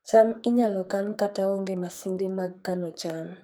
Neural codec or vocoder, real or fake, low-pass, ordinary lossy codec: codec, 44.1 kHz, 2.6 kbps, SNAC; fake; none; none